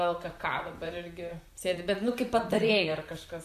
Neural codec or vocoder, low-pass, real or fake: vocoder, 44.1 kHz, 128 mel bands, Pupu-Vocoder; 14.4 kHz; fake